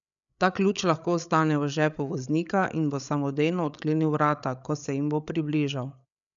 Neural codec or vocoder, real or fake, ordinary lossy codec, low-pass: codec, 16 kHz, 8 kbps, FreqCodec, larger model; fake; none; 7.2 kHz